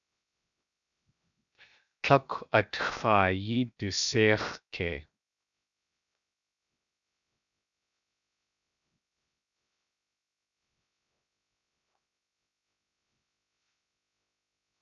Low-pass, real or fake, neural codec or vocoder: 7.2 kHz; fake; codec, 16 kHz, 0.7 kbps, FocalCodec